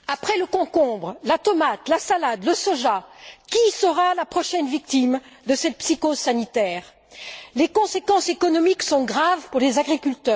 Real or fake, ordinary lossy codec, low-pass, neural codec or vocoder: real; none; none; none